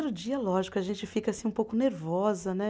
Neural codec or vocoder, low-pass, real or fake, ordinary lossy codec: none; none; real; none